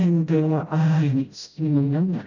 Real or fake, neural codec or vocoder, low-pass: fake; codec, 16 kHz, 0.5 kbps, FreqCodec, smaller model; 7.2 kHz